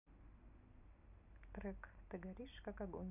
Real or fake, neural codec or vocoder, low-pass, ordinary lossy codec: real; none; 3.6 kHz; none